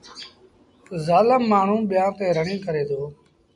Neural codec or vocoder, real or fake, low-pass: none; real; 10.8 kHz